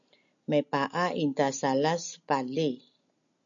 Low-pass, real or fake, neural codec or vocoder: 7.2 kHz; real; none